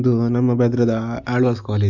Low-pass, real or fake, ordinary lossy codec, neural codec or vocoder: 7.2 kHz; real; none; none